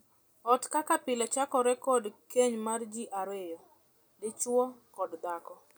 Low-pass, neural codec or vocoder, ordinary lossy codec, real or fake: none; none; none; real